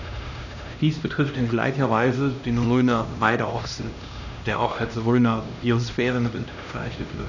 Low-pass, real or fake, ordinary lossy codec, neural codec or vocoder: 7.2 kHz; fake; none; codec, 16 kHz, 1 kbps, X-Codec, HuBERT features, trained on LibriSpeech